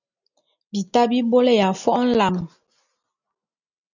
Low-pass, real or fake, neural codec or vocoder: 7.2 kHz; real; none